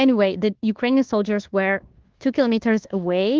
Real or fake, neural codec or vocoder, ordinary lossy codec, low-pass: fake; codec, 24 kHz, 1.2 kbps, DualCodec; Opus, 16 kbps; 7.2 kHz